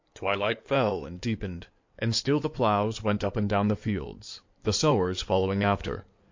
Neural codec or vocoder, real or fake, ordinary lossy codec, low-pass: codec, 16 kHz in and 24 kHz out, 2.2 kbps, FireRedTTS-2 codec; fake; MP3, 48 kbps; 7.2 kHz